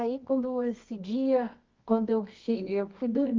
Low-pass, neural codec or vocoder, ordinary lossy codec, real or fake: 7.2 kHz; codec, 24 kHz, 0.9 kbps, WavTokenizer, medium music audio release; Opus, 24 kbps; fake